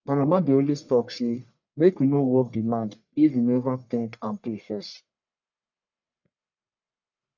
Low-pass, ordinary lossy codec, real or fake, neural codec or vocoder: 7.2 kHz; none; fake; codec, 44.1 kHz, 1.7 kbps, Pupu-Codec